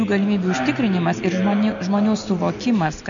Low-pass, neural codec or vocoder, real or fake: 7.2 kHz; none; real